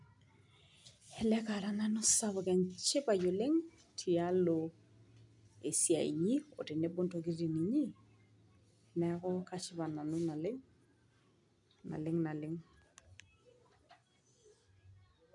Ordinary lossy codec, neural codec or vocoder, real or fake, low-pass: none; none; real; 10.8 kHz